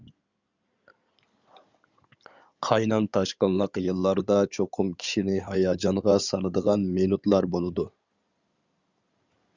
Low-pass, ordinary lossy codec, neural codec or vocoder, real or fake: 7.2 kHz; Opus, 64 kbps; codec, 16 kHz in and 24 kHz out, 2.2 kbps, FireRedTTS-2 codec; fake